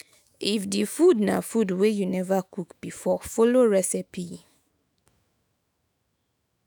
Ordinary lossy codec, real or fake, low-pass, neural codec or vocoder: none; fake; none; autoencoder, 48 kHz, 128 numbers a frame, DAC-VAE, trained on Japanese speech